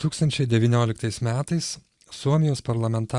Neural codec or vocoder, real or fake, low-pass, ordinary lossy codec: vocoder, 44.1 kHz, 128 mel bands every 512 samples, BigVGAN v2; fake; 10.8 kHz; Opus, 64 kbps